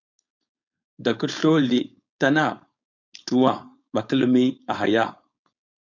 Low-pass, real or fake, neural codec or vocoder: 7.2 kHz; fake; codec, 16 kHz, 4.8 kbps, FACodec